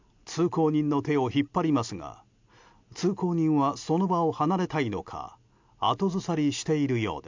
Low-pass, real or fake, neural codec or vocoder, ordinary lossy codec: 7.2 kHz; real; none; MP3, 64 kbps